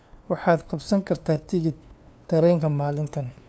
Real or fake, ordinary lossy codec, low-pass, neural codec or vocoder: fake; none; none; codec, 16 kHz, 2 kbps, FunCodec, trained on LibriTTS, 25 frames a second